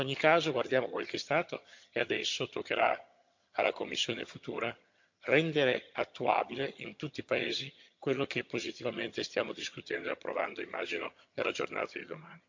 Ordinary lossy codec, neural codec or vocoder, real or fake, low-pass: MP3, 48 kbps; vocoder, 22.05 kHz, 80 mel bands, HiFi-GAN; fake; 7.2 kHz